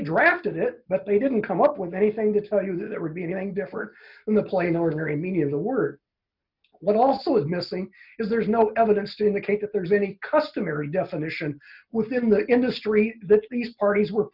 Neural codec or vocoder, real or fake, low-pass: none; real; 5.4 kHz